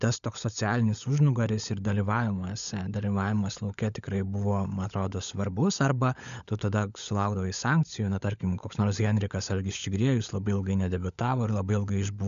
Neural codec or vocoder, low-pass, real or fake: codec, 16 kHz, 16 kbps, FunCodec, trained on LibriTTS, 50 frames a second; 7.2 kHz; fake